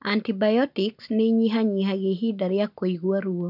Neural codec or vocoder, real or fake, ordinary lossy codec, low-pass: none; real; none; 5.4 kHz